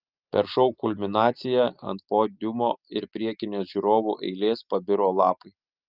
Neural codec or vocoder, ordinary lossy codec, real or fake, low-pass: none; Opus, 24 kbps; real; 5.4 kHz